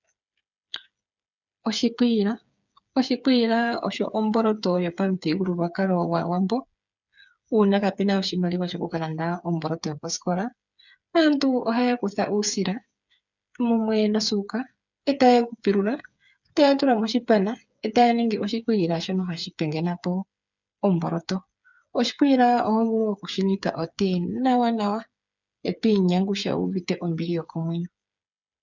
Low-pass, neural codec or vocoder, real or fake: 7.2 kHz; codec, 16 kHz, 8 kbps, FreqCodec, smaller model; fake